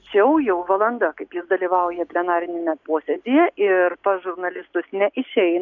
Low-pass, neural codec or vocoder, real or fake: 7.2 kHz; none; real